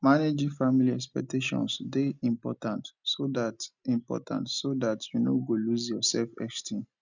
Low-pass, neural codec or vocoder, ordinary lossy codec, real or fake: 7.2 kHz; none; none; real